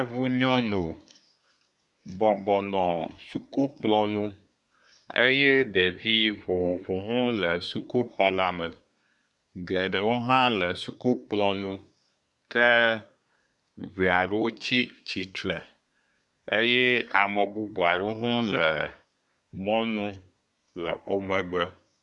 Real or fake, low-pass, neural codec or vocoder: fake; 10.8 kHz; codec, 24 kHz, 1 kbps, SNAC